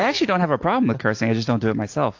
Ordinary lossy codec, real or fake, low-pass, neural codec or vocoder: AAC, 48 kbps; real; 7.2 kHz; none